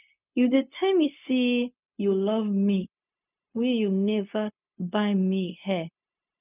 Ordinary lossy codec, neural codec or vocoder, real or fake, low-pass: none; codec, 16 kHz, 0.4 kbps, LongCat-Audio-Codec; fake; 3.6 kHz